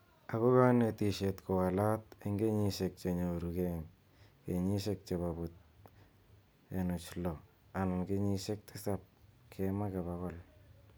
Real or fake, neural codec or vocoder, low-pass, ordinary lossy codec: real; none; none; none